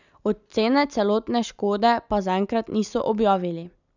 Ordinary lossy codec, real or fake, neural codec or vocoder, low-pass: none; real; none; 7.2 kHz